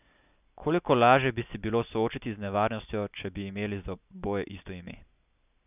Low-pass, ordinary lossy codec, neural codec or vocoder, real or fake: 3.6 kHz; none; none; real